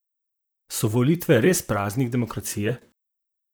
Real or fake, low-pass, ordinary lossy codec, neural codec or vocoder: fake; none; none; vocoder, 44.1 kHz, 128 mel bands, Pupu-Vocoder